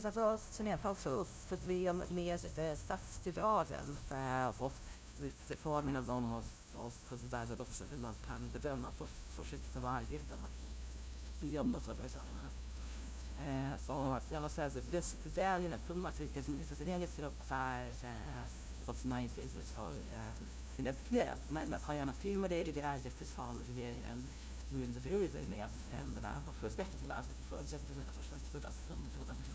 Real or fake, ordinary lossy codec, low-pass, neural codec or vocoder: fake; none; none; codec, 16 kHz, 0.5 kbps, FunCodec, trained on LibriTTS, 25 frames a second